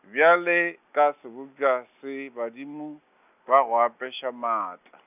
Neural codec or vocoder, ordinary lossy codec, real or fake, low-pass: none; none; real; 3.6 kHz